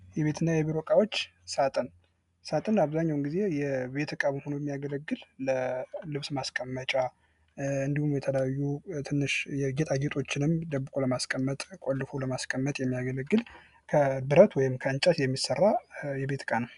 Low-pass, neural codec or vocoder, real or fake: 10.8 kHz; none; real